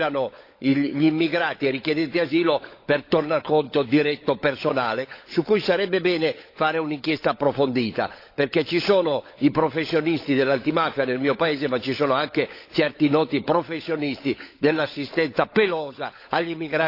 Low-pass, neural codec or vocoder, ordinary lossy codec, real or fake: 5.4 kHz; codec, 16 kHz, 16 kbps, FunCodec, trained on LibriTTS, 50 frames a second; AAC, 32 kbps; fake